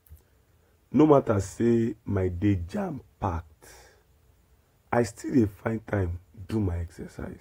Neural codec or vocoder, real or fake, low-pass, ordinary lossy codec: none; real; 19.8 kHz; AAC, 48 kbps